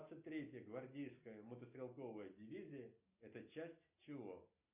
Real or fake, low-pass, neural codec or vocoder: real; 3.6 kHz; none